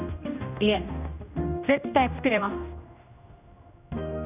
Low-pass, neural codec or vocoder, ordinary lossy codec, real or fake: 3.6 kHz; codec, 16 kHz, 1 kbps, X-Codec, HuBERT features, trained on general audio; none; fake